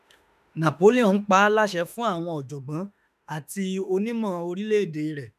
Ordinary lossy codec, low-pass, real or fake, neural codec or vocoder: none; 14.4 kHz; fake; autoencoder, 48 kHz, 32 numbers a frame, DAC-VAE, trained on Japanese speech